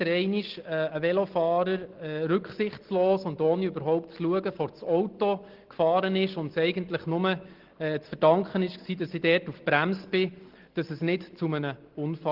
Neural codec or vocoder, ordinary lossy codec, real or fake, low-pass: none; Opus, 16 kbps; real; 5.4 kHz